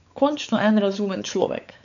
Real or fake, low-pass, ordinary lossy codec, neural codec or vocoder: fake; 7.2 kHz; none; codec, 16 kHz, 4 kbps, X-Codec, WavLM features, trained on Multilingual LibriSpeech